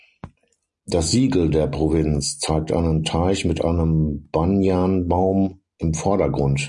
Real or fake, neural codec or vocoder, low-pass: real; none; 10.8 kHz